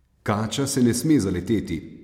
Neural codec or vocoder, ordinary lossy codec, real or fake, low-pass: none; MP3, 96 kbps; real; 19.8 kHz